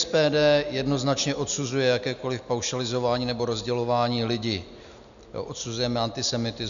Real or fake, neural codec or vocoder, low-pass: real; none; 7.2 kHz